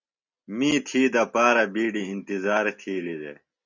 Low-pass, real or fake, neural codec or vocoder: 7.2 kHz; real; none